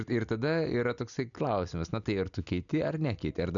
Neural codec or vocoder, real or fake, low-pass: none; real; 7.2 kHz